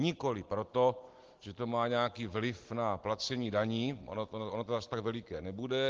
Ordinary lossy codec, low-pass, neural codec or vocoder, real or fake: Opus, 16 kbps; 7.2 kHz; none; real